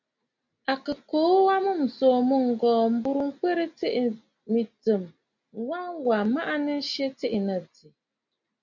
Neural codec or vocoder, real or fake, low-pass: none; real; 7.2 kHz